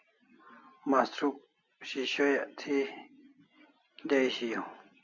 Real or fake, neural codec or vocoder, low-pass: real; none; 7.2 kHz